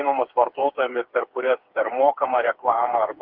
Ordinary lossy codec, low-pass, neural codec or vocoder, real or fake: Opus, 24 kbps; 5.4 kHz; codec, 16 kHz, 4 kbps, FreqCodec, smaller model; fake